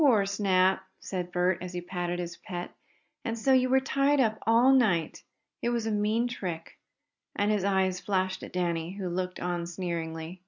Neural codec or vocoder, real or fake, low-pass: none; real; 7.2 kHz